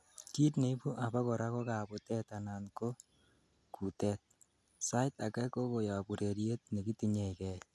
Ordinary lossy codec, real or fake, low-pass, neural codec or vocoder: none; real; none; none